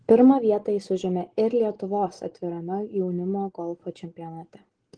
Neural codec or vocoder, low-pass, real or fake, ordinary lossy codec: none; 9.9 kHz; real; Opus, 16 kbps